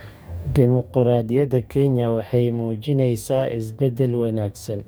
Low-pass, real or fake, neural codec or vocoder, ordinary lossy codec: none; fake; codec, 44.1 kHz, 2.6 kbps, DAC; none